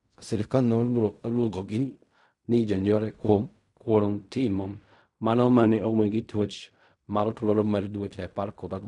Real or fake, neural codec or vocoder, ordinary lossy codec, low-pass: fake; codec, 16 kHz in and 24 kHz out, 0.4 kbps, LongCat-Audio-Codec, fine tuned four codebook decoder; none; 10.8 kHz